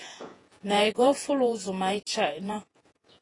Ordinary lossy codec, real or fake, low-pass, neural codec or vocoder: AAC, 32 kbps; fake; 10.8 kHz; vocoder, 48 kHz, 128 mel bands, Vocos